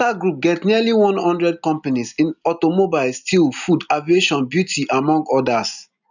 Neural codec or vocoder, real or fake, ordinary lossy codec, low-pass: none; real; none; 7.2 kHz